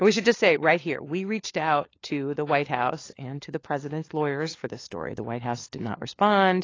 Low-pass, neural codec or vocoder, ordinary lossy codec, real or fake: 7.2 kHz; codec, 16 kHz, 4 kbps, FunCodec, trained on LibriTTS, 50 frames a second; AAC, 32 kbps; fake